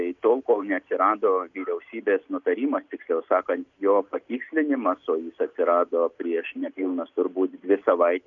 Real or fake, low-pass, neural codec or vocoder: real; 7.2 kHz; none